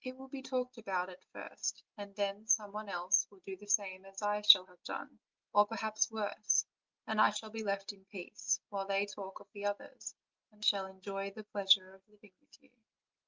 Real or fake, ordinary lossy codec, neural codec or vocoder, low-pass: real; Opus, 24 kbps; none; 7.2 kHz